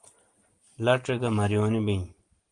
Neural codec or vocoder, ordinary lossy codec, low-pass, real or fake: none; Opus, 24 kbps; 9.9 kHz; real